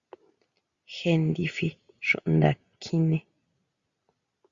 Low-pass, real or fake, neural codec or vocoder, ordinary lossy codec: 7.2 kHz; real; none; Opus, 64 kbps